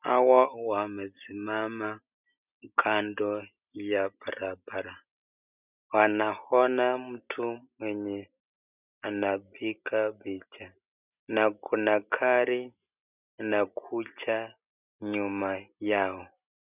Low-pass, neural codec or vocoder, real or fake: 3.6 kHz; none; real